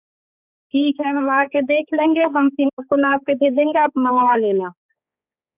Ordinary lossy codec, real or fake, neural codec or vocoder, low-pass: none; fake; codec, 16 kHz, 4 kbps, X-Codec, HuBERT features, trained on balanced general audio; 3.6 kHz